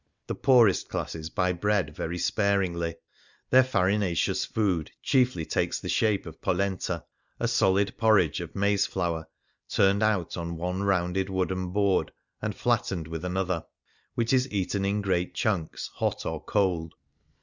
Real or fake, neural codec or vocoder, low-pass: real; none; 7.2 kHz